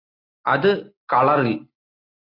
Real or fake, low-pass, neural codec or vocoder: real; 5.4 kHz; none